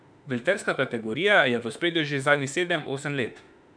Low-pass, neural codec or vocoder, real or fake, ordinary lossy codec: 9.9 kHz; autoencoder, 48 kHz, 32 numbers a frame, DAC-VAE, trained on Japanese speech; fake; none